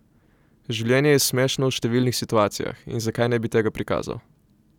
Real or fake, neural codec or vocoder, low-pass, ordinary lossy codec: real; none; 19.8 kHz; none